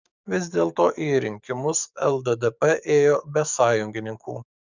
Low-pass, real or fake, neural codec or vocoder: 7.2 kHz; fake; codec, 44.1 kHz, 7.8 kbps, DAC